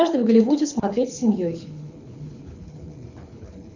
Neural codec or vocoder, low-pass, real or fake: codec, 24 kHz, 6 kbps, HILCodec; 7.2 kHz; fake